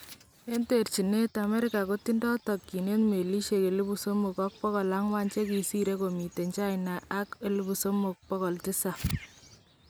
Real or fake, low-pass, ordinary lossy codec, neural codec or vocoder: real; none; none; none